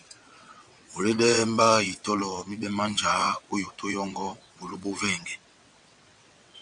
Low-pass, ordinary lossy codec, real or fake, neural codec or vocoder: 9.9 kHz; MP3, 96 kbps; fake; vocoder, 22.05 kHz, 80 mel bands, WaveNeXt